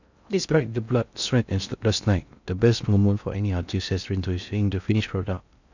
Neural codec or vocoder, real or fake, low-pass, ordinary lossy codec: codec, 16 kHz in and 24 kHz out, 0.6 kbps, FocalCodec, streaming, 2048 codes; fake; 7.2 kHz; none